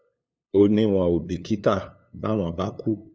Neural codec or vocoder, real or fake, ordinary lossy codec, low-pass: codec, 16 kHz, 2 kbps, FunCodec, trained on LibriTTS, 25 frames a second; fake; none; none